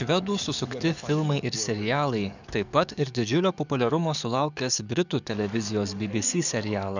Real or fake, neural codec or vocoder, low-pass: fake; codec, 44.1 kHz, 7.8 kbps, DAC; 7.2 kHz